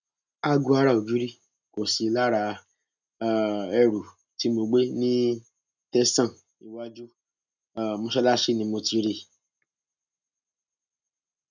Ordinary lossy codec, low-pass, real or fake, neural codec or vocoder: none; 7.2 kHz; real; none